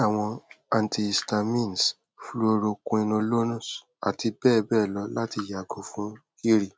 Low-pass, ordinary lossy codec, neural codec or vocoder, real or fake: none; none; none; real